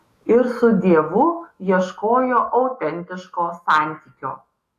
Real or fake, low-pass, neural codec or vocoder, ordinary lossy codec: fake; 14.4 kHz; autoencoder, 48 kHz, 128 numbers a frame, DAC-VAE, trained on Japanese speech; AAC, 48 kbps